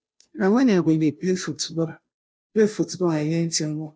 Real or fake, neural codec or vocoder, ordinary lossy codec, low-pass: fake; codec, 16 kHz, 0.5 kbps, FunCodec, trained on Chinese and English, 25 frames a second; none; none